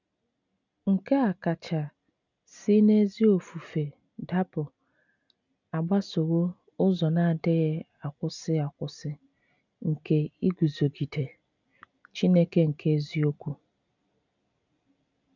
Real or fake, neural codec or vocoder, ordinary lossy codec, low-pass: real; none; none; 7.2 kHz